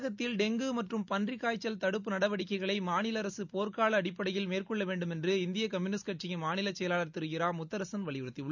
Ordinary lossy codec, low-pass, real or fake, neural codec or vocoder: none; 7.2 kHz; real; none